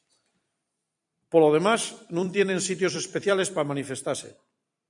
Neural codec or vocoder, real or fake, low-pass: vocoder, 44.1 kHz, 128 mel bands every 256 samples, BigVGAN v2; fake; 10.8 kHz